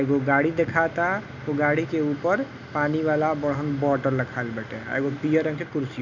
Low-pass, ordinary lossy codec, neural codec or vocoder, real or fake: 7.2 kHz; none; none; real